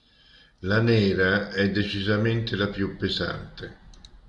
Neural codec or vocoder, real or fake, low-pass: none; real; 10.8 kHz